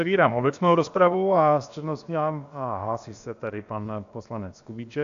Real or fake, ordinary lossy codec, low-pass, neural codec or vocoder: fake; AAC, 64 kbps; 7.2 kHz; codec, 16 kHz, about 1 kbps, DyCAST, with the encoder's durations